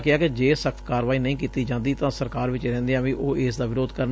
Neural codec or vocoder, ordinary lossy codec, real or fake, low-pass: none; none; real; none